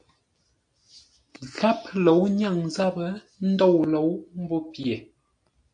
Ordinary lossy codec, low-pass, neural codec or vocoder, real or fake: AAC, 64 kbps; 9.9 kHz; none; real